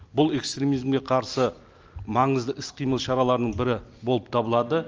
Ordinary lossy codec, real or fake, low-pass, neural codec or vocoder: Opus, 24 kbps; real; 7.2 kHz; none